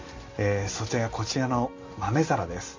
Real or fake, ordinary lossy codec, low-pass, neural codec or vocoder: real; MP3, 64 kbps; 7.2 kHz; none